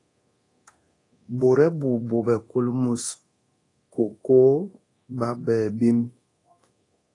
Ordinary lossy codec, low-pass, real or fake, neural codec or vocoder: AAC, 32 kbps; 10.8 kHz; fake; codec, 24 kHz, 0.9 kbps, DualCodec